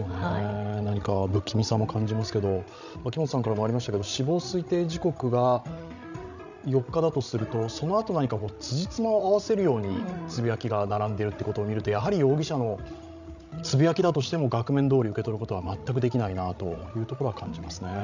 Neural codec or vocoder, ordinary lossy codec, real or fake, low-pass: codec, 16 kHz, 16 kbps, FreqCodec, larger model; none; fake; 7.2 kHz